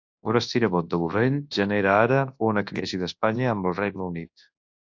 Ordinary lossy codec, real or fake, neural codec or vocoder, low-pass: AAC, 48 kbps; fake; codec, 24 kHz, 0.9 kbps, WavTokenizer, large speech release; 7.2 kHz